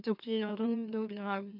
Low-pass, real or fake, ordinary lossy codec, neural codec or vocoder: 5.4 kHz; fake; none; autoencoder, 44.1 kHz, a latent of 192 numbers a frame, MeloTTS